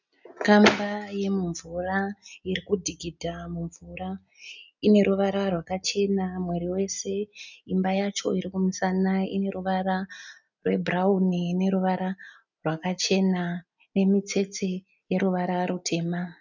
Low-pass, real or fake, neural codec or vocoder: 7.2 kHz; real; none